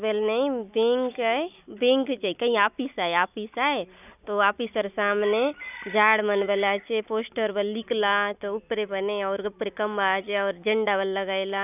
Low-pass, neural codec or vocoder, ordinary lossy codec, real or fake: 3.6 kHz; none; none; real